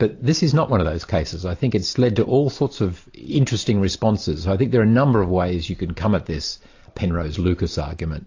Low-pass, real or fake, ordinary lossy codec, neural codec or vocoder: 7.2 kHz; real; AAC, 48 kbps; none